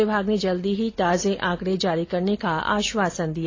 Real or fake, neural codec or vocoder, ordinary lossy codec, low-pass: real; none; AAC, 32 kbps; 7.2 kHz